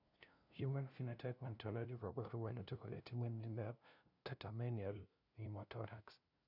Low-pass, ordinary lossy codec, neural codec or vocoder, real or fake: 5.4 kHz; none; codec, 16 kHz, 0.5 kbps, FunCodec, trained on LibriTTS, 25 frames a second; fake